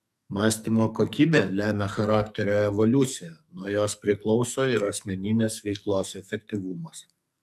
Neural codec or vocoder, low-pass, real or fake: codec, 44.1 kHz, 2.6 kbps, SNAC; 14.4 kHz; fake